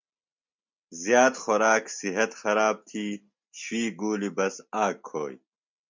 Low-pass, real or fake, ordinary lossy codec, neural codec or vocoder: 7.2 kHz; real; MP3, 64 kbps; none